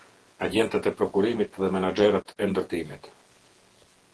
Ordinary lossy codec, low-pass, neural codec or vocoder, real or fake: Opus, 16 kbps; 10.8 kHz; vocoder, 48 kHz, 128 mel bands, Vocos; fake